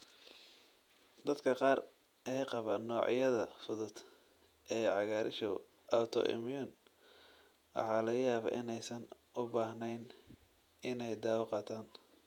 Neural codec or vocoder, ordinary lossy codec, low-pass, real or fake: vocoder, 48 kHz, 128 mel bands, Vocos; none; 19.8 kHz; fake